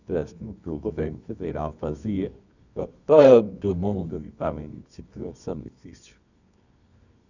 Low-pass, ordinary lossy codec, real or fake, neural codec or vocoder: 7.2 kHz; none; fake; codec, 24 kHz, 0.9 kbps, WavTokenizer, medium music audio release